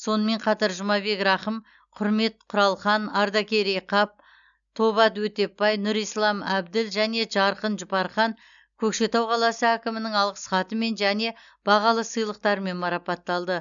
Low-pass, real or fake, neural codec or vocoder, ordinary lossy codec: 7.2 kHz; real; none; none